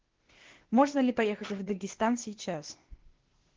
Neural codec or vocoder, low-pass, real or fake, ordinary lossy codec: codec, 16 kHz, 0.8 kbps, ZipCodec; 7.2 kHz; fake; Opus, 16 kbps